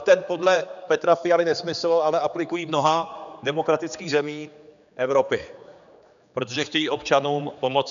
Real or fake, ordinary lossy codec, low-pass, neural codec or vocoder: fake; MP3, 96 kbps; 7.2 kHz; codec, 16 kHz, 4 kbps, X-Codec, HuBERT features, trained on general audio